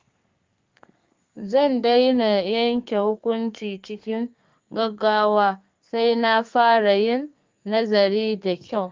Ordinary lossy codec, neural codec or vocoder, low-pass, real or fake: Opus, 32 kbps; codec, 32 kHz, 1.9 kbps, SNAC; 7.2 kHz; fake